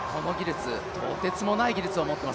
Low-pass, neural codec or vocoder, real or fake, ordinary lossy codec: none; none; real; none